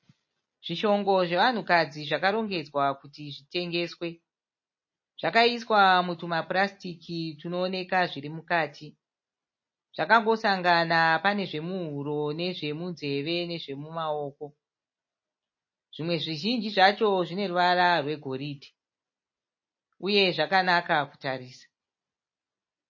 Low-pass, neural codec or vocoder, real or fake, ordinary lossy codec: 7.2 kHz; none; real; MP3, 32 kbps